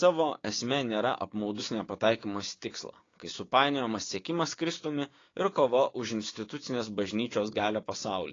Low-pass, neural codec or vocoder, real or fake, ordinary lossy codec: 7.2 kHz; codec, 16 kHz, 6 kbps, DAC; fake; AAC, 32 kbps